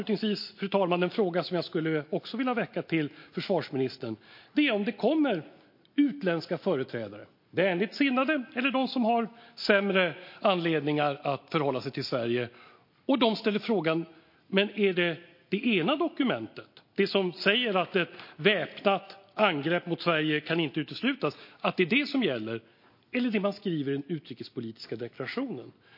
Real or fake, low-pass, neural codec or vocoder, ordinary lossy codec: real; 5.4 kHz; none; MP3, 32 kbps